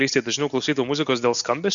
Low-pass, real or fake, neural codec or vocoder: 7.2 kHz; real; none